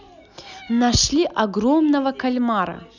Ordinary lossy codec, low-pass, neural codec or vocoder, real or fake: none; 7.2 kHz; none; real